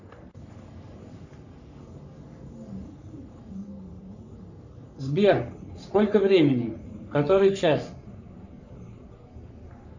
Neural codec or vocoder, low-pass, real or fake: codec, 44.1 kHz, 3.4 kbps, Pupu-Codec; 7.2 kHz; fake